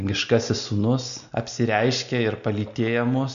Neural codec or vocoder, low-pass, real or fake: none; 7.2 kHz; real